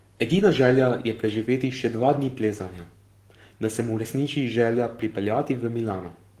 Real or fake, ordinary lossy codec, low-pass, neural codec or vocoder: fake; Opus, 24 kbps; 14.4 kHz; codec, 44.1 kHz, 7.8 kbps, Pupu-Codec